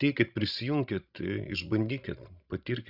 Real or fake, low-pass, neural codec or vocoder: fake; 5.4 kHz; codec, 16 kHz, 16 kbps, FreqCodec, larger model